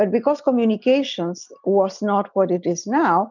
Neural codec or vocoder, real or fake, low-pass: none; real; 7.2 kHz